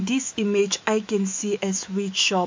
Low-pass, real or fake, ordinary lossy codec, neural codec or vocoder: 7.2 kHz; fake; MP3, 64 kbps; autoencoder, 48 kHz, 128 numbers a frame, DAC-VAE, trained on Japanese speech